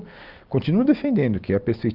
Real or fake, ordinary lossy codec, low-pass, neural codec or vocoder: real; Opus, 24 kbps; 5.4 kHz; none